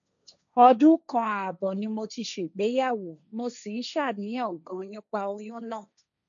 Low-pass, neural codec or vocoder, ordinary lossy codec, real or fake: 7.2 kHz; codec, 16 kHz, 1.1 kbps, Voila-Tokenizer; none; fake